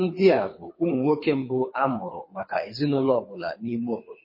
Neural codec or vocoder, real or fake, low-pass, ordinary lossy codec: codec, 24 kHz, 3 kbps, HILCodec; fake; 5.4 kHz; MP3, 24 kbps